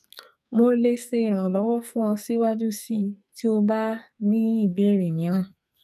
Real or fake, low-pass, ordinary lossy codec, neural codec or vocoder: fake; 14.4 kHz; none; codec, 44.1 kHz, 2.6 kbps, SNAC